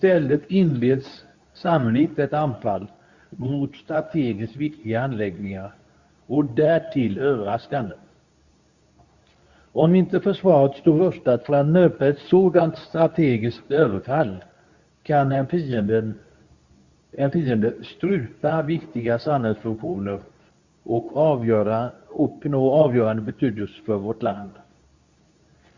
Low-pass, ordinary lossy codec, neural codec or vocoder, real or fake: 7.2 kHz; Opus, 64 kbps; codec, 24 kHz, 0.9 kbps, WavTokenizer, medium speech release version 2; fake